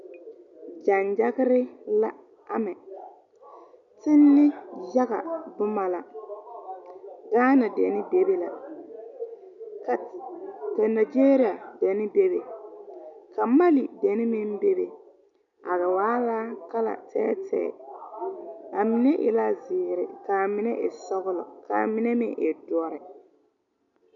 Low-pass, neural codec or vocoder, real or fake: 7.2 kHz; none; real